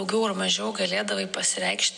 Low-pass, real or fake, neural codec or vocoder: 10.8 kHz; real; none